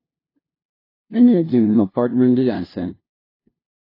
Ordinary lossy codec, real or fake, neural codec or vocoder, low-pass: AAC, 32 kbps; fake; codec, 16 kHz, 0.5 kbps, FunCodec, trained on LibriTTS, 25 frames a second; 5.4 kHz